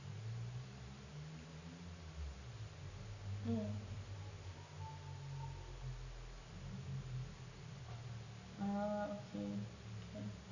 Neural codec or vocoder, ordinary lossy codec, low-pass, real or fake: none; none; 7.2 kHz; real